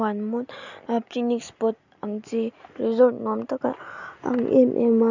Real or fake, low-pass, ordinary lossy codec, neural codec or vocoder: real; 7.2 kHz; none; none